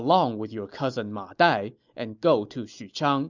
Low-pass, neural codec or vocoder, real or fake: 7.2 kHz; none; real